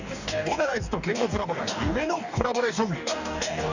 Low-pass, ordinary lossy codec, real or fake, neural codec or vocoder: 7.2 kHz; none; fake; codec, 44.1 kHz, 2.6 kbps, DAC